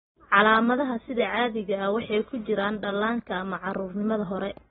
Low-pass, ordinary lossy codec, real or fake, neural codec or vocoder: 19.8 kHz; AAC, 16 kbps; real; none